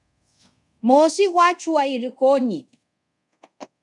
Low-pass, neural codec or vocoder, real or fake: 10.8 kHz; codec, 24 kHz, 0.5 kbps, DualCodec; fake